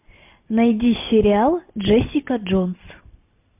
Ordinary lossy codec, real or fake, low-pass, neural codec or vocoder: MP3, 32 kbps; real; 3.6 kHz; none